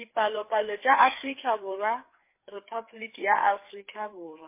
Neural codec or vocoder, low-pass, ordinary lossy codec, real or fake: codec, 16 kHz, 4 kbps, FreqCodec, larger model; 3.6 kHz; MP3, 24 kbps; fake